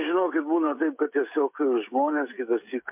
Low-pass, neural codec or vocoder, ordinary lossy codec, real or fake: 3.6 kHz; codec, 16 kHz, 16 kbps, FreqCodec, smaller model; MP3, 32 kbps; fake